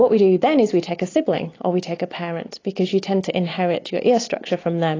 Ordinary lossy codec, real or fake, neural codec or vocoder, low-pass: AAC, 32 kbps; real; none; 7.2 kHz